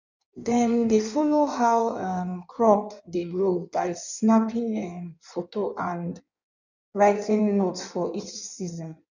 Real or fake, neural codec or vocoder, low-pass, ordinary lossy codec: fake; codec, 16 kHz in and 24 kHz out, 1.1 kbps, FireRedTTS-2 codec; 7.2 kHz; none